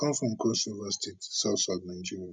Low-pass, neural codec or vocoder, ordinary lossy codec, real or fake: 9.9 kHz; none; none; real